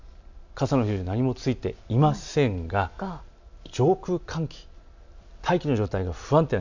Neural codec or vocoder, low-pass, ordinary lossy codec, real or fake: none; 7.2 kHz; none; real